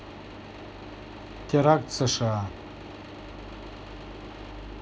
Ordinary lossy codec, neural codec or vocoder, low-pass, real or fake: none; none; none; real